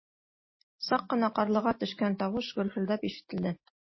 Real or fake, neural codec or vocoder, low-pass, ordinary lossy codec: real; none; 7.2 kHz; MP3, 24 kbps